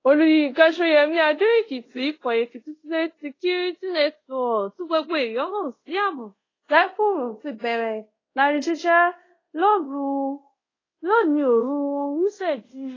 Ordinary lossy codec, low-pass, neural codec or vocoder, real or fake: AAC, 32 kbps; 7.2 kHz; codec, 24 kHz, 0.5 kbps, DualCodec; fake